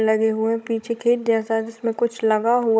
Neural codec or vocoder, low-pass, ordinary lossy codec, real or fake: codec, 16 kHz, 16 kbps, FunCodec, trained on Chinese and English, 50 frames a second; none; none; fake